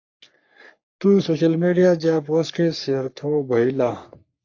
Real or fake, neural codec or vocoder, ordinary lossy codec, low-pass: fake; codec, 44.1 kHz, 3.4 kbps, Pupu-Codec; AAC, 48 kbps; 7.2 kHz